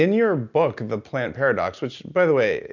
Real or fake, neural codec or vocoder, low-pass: real; none; 7.2 kHz